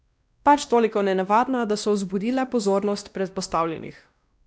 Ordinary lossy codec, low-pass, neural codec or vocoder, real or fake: none; none; codec, 16 kHz, 1 kbps, X-Codec, WavLM features, trained on Multilingual LibriSpeech; fake